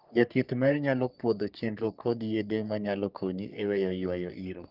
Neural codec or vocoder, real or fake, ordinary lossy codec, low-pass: codec, 44.1 kHz, 3.4 kbps, Pupu-Codec; fake; Opus, 24 kbps; 5.4 kHz